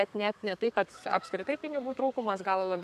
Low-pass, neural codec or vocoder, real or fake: 14.4 kHz; codec, 32 kHz, 1.9 kbps, SNAC; fake